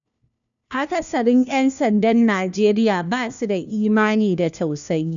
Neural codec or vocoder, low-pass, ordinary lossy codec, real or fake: codec, 16 kHz, 1 kbps, FunCodec, trained on LibriTTS, 50 frames a second; 7.2 kHz; none; fake